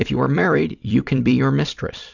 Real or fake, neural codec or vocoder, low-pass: fake; vocoder, 44.1 kHz, 128 mel bands every 256 samples, BigVGAN v2; 7.2 kHz